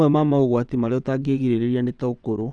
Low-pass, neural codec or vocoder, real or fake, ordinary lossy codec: 9.9 kHz; vocoder, 22.05 kHz, 80 mel bands, WaveNeXt; fake; none